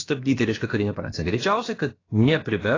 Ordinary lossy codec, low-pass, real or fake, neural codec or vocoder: AAC, 32 kbps; 7.2 kHz; fake; codec, 16 kHz, about 1 kbps, DyCAST, with the encoder's durations